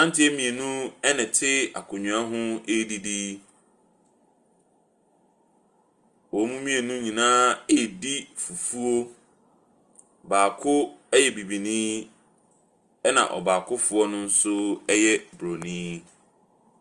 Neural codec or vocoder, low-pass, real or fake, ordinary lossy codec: none; 10.8 kHz; real; Opus, 32 kbps